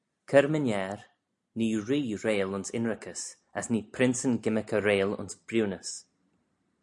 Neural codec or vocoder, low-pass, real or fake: none; 10.8 kHz; real